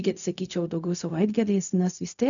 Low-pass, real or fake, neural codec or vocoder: 7.2 kHz; fake; codec, 16 kHz, 0.4 kbps, LongCat-Audio-Codec